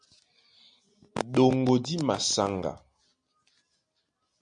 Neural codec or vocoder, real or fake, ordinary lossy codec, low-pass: none; real; MP3, 64 kbps; 9.9 kHz